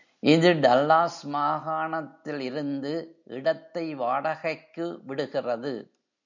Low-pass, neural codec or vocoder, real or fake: 7.2 kHz; none; real